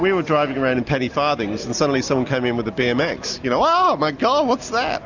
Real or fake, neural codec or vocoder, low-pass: real; none; 7.2 kHz